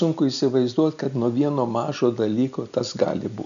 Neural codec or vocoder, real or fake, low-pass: none; real; 7.2 kHz